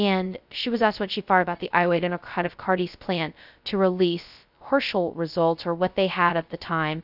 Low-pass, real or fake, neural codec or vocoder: 5.4 kHz; fake; codec, 16 kHz, 0.2 kbps, FocalCodec